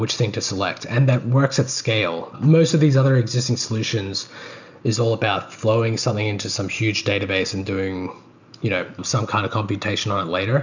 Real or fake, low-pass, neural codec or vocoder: real; 7.2 kHz; none